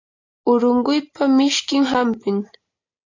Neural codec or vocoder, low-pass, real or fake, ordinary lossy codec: none; 7.2 kHz; real; AAC, 48 kbps